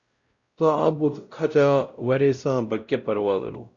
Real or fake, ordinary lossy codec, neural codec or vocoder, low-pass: fake; Opus, 64 kbps; codec, 16 kHz, 0.5 kbps, X-Codec, WavLM features, trained on Multilingual LibriSpeech; 7.2 kHz